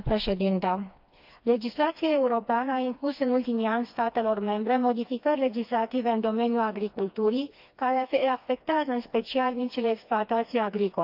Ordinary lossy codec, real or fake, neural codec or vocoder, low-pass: AAC, 48 kbps; fake; codec, 16 kHz, 2 kbps, FreqCodec, smaller model; 5.4 kHz